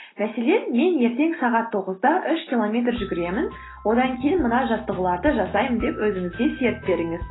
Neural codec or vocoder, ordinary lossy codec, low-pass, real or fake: none; AAC, 16 kbps; 7.2 kHz; real